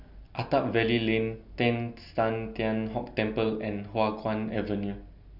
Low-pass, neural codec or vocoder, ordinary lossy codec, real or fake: 5.4 kHz; none; none; real